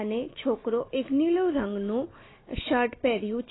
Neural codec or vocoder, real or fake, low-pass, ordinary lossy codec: none; real; 7.2 kHz; AAC, 16 kbps